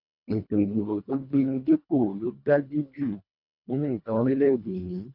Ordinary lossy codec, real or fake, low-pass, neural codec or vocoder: none; fake; 5.4 kHz; codec, 24 kHz, 1.5 kbps, HILCodec